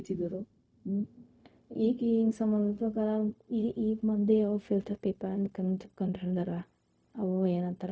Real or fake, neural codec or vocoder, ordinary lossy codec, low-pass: fake; codec, 16 kHz, 0.4 kbps, LongCat-Audio-Codec; none; none